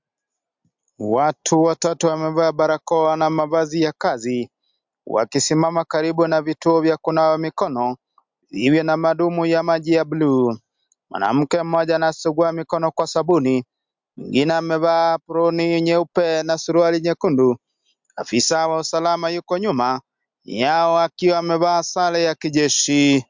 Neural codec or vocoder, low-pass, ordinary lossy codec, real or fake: none; 7.2 kHz; MP3, 64 kbps; real